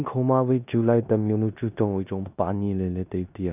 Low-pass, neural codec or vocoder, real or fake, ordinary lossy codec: 3.6 kHz; codec, 16 kHz in and 24 kHz out, 0.9 kbps, LongCat-Audio-Codec, four codebook decoder; fake; none